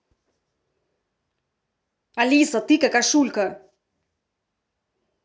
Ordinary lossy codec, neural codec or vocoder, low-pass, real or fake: none; none; none; real